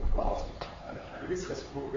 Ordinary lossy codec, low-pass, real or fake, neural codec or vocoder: AAC, 24 kbps; 7.2 kHz; fake; codec, 16 kHz, 4 kbps, X-Codec, HuBERT features, trained on LibriSpeech